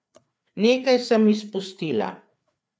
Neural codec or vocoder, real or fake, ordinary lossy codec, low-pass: codec, 16 kHz, 4 kbps, FreqCodec, larger model; fake; none; none